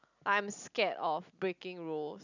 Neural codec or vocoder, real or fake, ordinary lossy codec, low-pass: none; real; none; 7.2 kHz